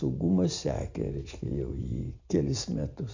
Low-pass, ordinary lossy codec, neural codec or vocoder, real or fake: 7.2 kHz; AAC, 48 kbps; none; real